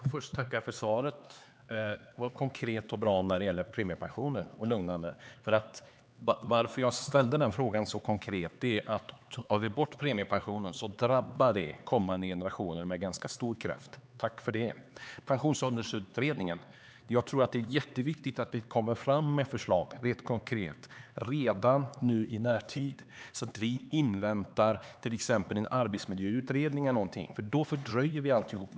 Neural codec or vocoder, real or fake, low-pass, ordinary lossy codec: codec, 16 kHz, 4 kbps, X-Codec, HuBERT features, trained on LibriSpeech; fake; none; none